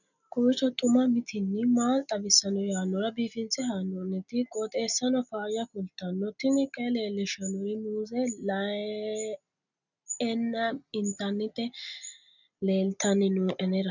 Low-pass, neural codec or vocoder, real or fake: 7.2 kHz; none; real